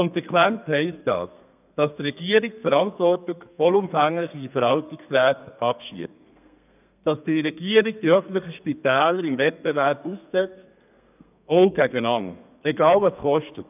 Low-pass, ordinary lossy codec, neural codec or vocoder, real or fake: 3.6 kHz; none; codec, 32 kHz, 1.9 kbps, SNAC; fake